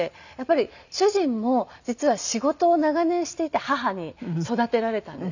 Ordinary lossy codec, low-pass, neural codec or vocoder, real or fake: none; 7.2 kHz; none; real